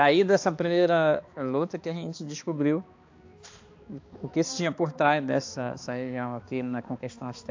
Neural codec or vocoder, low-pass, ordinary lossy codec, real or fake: codec, 16 kHz, 2 kbps, X-Codec, HuBERT features, trained on balanced general audio; 7.2 kHz; AAC, 48 kbps; fake